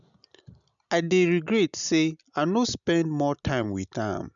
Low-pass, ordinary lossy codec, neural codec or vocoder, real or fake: 7.2 kHz; none; none; real